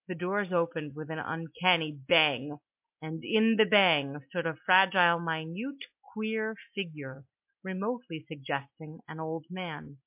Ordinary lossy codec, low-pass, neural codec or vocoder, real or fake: MP3, 32 kbps; 3.6 kHz; none; real